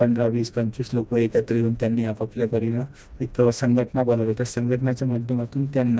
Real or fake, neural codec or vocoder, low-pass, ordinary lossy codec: fake; codec, 16 kHz, 1 kbps, FreqCodec, smaller model; none; none